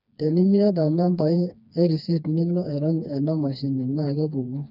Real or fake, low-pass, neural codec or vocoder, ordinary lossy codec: fake; 5.4 kHz; codec, 16 kHz, 2 kbps, FreqCodec, smaller model; none